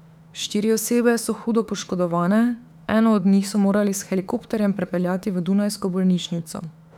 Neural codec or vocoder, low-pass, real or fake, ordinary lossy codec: autoencoder, 48 kHz, 32 numbers a frame, DAC-VAE, trained on Japanese speech; 19.8 kHz; fake; none